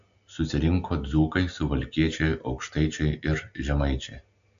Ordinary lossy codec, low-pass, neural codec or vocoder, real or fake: AAC, 64 kbps; 7.2 kHz; none; real